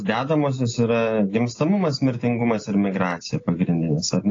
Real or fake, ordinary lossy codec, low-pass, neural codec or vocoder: real; AAC, 32 kbps; 7.2 kHz; none